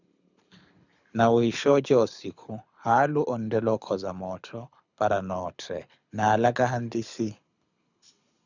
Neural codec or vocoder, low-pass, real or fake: codec, 24 kHz, 6 kbps, HILCodec; 7.2 kHz; fake